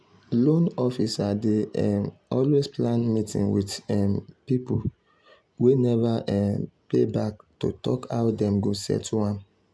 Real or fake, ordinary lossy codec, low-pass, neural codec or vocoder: real; none; none; none